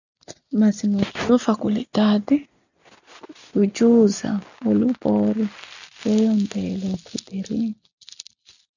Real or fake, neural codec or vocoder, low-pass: real; none; 7.2 kHz